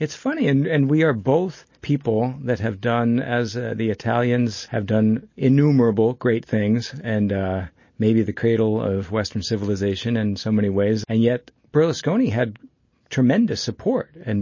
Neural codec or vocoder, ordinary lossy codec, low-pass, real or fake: none; MP3, 32 kbps; 7.2 kHz; real